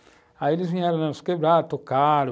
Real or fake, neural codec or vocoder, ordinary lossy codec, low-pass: real; none; none; none